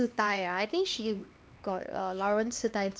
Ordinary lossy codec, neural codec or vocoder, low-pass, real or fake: none; codec, 16 kHz, 2 kbps, X-Codec, HuBERT features, trained on LibriSpeech; none; fake